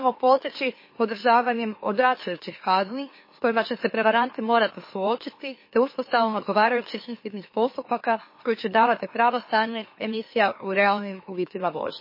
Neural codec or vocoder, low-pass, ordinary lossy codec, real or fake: autoencoder, 44.1 kHz, a latent of 192 numbers a frame, MeloTTS; 5.4 kHz; MP3, 24 kbps; fake